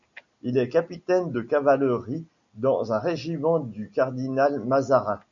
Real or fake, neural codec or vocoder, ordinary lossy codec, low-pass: real; none; MP3, 96 kbps; 7.2 kHz